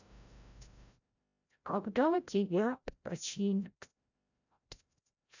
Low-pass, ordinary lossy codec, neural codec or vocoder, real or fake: 7.2 kHz; none; codec, 16 kHz, 0.5 kbps, FreqCodec, larger model; fake